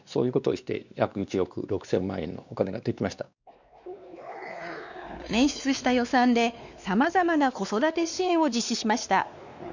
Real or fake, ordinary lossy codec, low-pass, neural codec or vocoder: fake; none; 7.2 kHz; codec, 16 kHz, 2 kbps, X-Codec, WavLM features, trained on Multilingual LibriSpeech